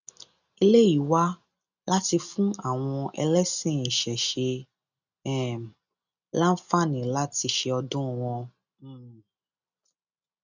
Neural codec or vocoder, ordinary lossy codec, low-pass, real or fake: none; none; 7.2 kHz; real